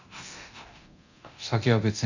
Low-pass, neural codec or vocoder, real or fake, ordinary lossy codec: 7.2 kHz; codec, 24 kHz, 0.9 kbps, DualCodec; fake; none